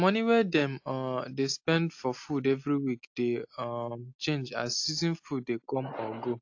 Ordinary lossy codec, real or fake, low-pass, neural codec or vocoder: AAC, 48 kbps; real; 7.2 kHz; none